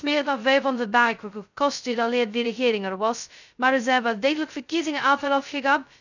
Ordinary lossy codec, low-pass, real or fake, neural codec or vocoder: none; 7.2 kHz; fake; codec, 16 kHz, 0.2 kbps, FocalCodec